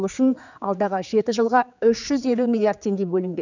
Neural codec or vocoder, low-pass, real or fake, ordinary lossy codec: codec, 16 kHz, 4 kbps, X-Codec, HuBERT features, trained on general audio; 7.2 kHz; fake; none